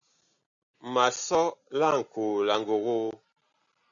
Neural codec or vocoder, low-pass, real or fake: none; 7.2 kHz; real